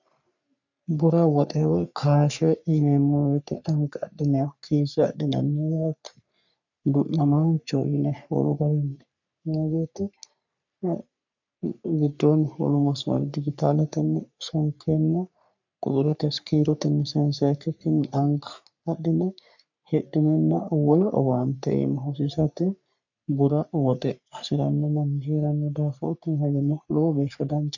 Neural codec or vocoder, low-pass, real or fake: codec, 44.1 kHz, 3.4 kbps, Pupu-Codec; 7.2 kHz; fake